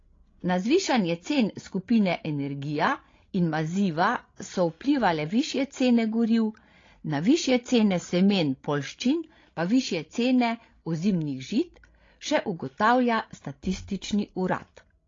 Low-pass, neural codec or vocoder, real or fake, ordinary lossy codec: 7.2 kHz; codec, 16 kHz, 16 kbps, FreqCodec, larger model; fake; AAC, 32 kbps